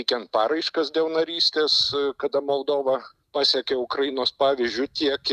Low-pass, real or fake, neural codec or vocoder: 14.4 kHz; real; none